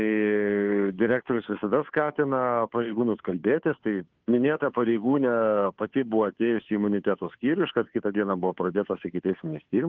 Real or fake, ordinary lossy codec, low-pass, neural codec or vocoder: fake; Opus, 32 kbps; 7.2 kHz; codec, 16 kHz, 2 kbps, FunCodec, trained on Chinese and English, 25 frames a second